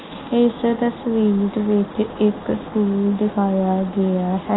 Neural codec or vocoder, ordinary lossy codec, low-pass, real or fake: none; AAC, 16 kbps; 7.2 kHz; real